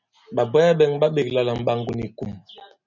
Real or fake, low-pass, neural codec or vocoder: real; 7.2 kHz; none